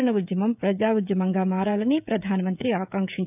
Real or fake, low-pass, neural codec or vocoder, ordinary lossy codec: fake; 3.6 kHz; codec, 16 kHz, 16 kbps, FreqCodec, smaller model; none